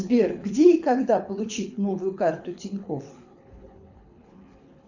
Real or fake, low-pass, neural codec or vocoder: fake; 7.2 kHz; codec, 24 kHz, 6 kbps, HILCodec